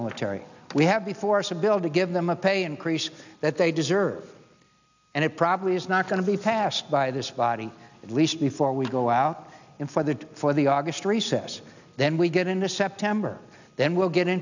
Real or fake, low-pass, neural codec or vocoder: real; 7.2 kHz; none